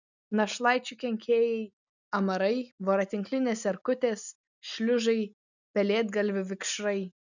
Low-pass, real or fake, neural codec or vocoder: 7.2 kHz; real; none